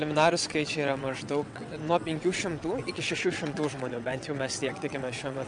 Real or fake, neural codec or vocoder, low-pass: fake; vocoder, 22.05 kHz, 80 mel bands, WaveNeXt; 9.9 kHz